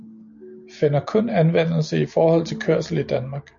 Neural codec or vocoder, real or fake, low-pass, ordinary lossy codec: none; real; 7.2 kHz; MP3, 48 kbps